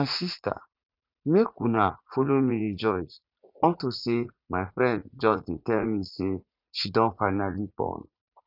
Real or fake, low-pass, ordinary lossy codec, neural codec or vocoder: fake; 5.4 kHz; none; vocoder, 44.1 kHz, 80 mel bands, Vocos